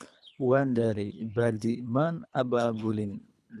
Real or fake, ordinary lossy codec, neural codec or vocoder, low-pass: fake; none; codec, 24 kHz, 3 kbps, HILCodec; none